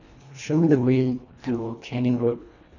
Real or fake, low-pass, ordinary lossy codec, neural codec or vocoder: fake; 7.2 kHz; none; codec, 24 kHz, 1.5 kbps, HILCodec